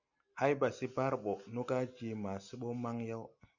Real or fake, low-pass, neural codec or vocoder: real; 7.2 kHz; none